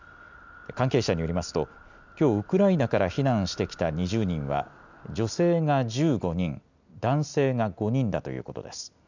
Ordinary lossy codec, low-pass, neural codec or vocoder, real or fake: none; 7.2 kHz; none; real